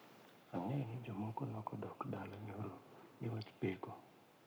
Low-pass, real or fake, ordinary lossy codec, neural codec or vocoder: none; fake; none; codec, 44.1 kHz, 7.8 kbps, Pupu-Codec